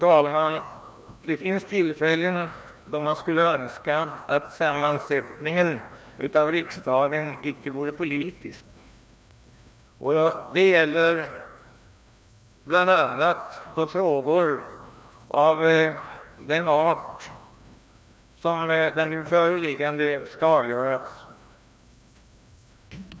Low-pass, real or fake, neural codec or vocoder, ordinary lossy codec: none; fake; codec, 16 kHz, 1 kbps, FreqCodec, larger model; none